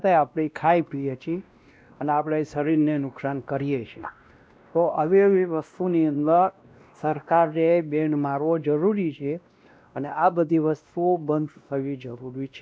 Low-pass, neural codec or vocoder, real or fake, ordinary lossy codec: none; codec, 16 kHz, 1 kbps, X-Codec, WavLM features, trained on Multilingual LibriSpeech; fake; none